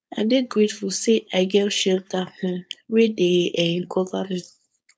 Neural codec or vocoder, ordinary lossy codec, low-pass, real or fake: codec, 16 kHz, 4.8 kbps, FACodec; none; none; fake